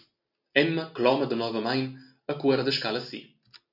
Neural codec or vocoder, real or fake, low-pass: none; real; 5.4 kHz